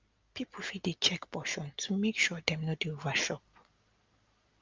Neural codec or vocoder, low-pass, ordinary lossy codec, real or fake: none; 7.2 kHz; Opus, 32 kbps; real